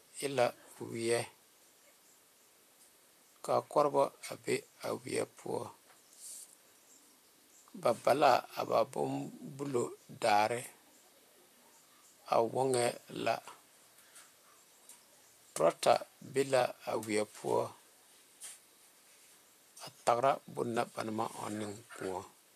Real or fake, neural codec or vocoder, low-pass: fake; vocoder, 44.1 kHz, 128 mel bands, Pupu-Vocoder; 14.4 kHz